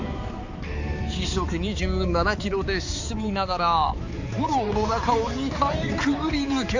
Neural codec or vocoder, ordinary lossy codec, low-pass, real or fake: codec, 16 kHz, 4 kbps, X-Codec, HuBERT features, trained on balanced general audio; AAC, 48 kbps; 7.2 kHz; fake